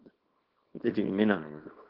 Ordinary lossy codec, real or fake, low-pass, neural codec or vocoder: Opus, 16 kbps; fake; 5.4 kHz; codec, 24 kHz, 0.9 kbps, WavTokenizer, small release